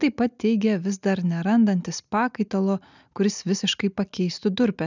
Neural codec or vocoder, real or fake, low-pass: none; real; 7.2 kHz